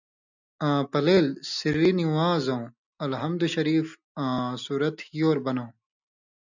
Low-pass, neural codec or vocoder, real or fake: 7.2 kHz; none; real